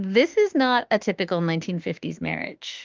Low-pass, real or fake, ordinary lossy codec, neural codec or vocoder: 7.2 kHz; fake; Opus, 24 kbps; autoencoder, 48 kHz, 32 numbers a frame, DAC-VAE, trained on Japanese speech